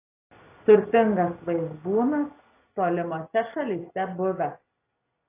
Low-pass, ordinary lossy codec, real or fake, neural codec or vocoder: 3.6 kHz; AAC, 24 kbps; real; none